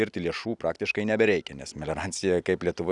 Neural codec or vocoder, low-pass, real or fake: none; 10.8 kHz; real